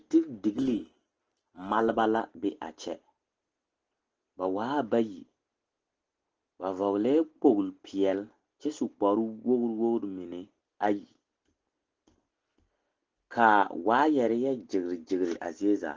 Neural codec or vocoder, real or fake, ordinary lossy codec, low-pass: none; real; Opus, 16 kbps; 7.2 kHz